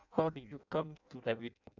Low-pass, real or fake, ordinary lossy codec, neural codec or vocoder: 7.2 kHz; fake; none; codec, 16 kHz in and 24 kHz out, 0.6 kbps, FireRedTTS-2 codec